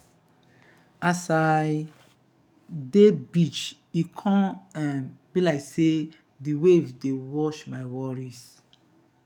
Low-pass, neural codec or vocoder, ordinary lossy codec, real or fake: 19.8 kHz; codec, 44.1 kHz, 7.8 kbps, DAC; none; fake